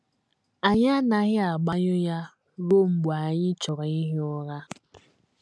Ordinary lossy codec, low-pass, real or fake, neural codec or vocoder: none; none; real; none